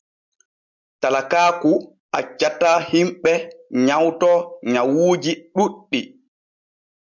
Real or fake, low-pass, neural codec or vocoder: real; 7.2 kHz; none